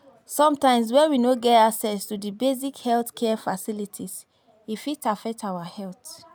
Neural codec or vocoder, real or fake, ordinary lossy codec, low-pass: none; real; none; none